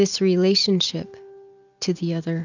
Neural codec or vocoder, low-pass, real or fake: none; 7.2 kHz; real